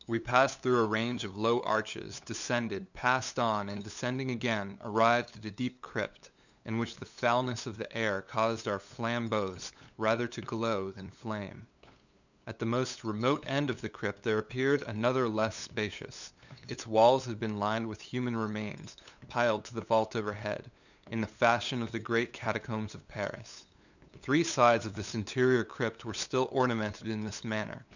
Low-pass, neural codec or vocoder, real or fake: 7.2 kHz; codec, 16 kHz, 8 kbps, FunCodec, trained on LibriTTS, 25 frames a second; fake